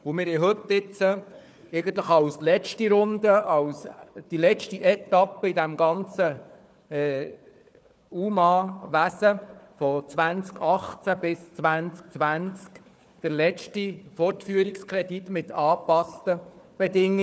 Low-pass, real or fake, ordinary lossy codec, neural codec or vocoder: none; fake; none; codec, 16 kHz, 4 kbps, FunCodec, trained on Chinese and English, 50 frames a second